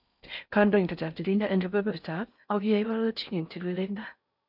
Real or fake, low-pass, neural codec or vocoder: fake; 5.4 kHz; codec, 16 kHz in and 24 kHz out, 0.6 kbps, FocalCodec, streaming, 4096 codes